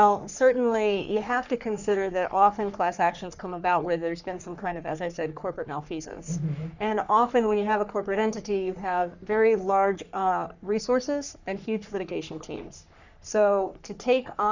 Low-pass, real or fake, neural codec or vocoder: 7.2 kHz; fake; codec, 44.1 kHz, 3.4 kbps, Pupu-Codec